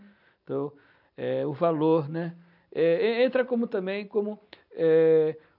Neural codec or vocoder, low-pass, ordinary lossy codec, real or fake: none; 5.4 kHz; none; real